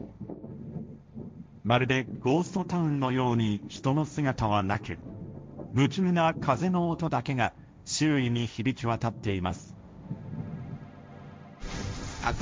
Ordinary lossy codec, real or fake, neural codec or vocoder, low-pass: none; fake; codec, 16 kHz, 1.1 kbps, Voila-Tokenizer; none